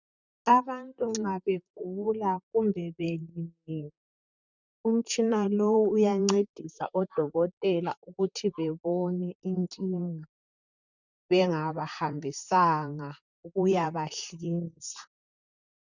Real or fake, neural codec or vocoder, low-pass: fake; vocoder, 44.1 kHz, 128 mel bands, Pupu-Vocoder; 7.2 kHz